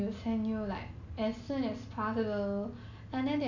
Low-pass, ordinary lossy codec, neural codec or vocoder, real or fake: 7.2 kHz; none; none; real